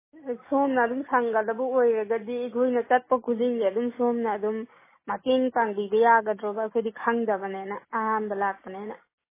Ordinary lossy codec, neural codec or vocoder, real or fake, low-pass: MP3, 16 kbps; none; real; 3.6 kHz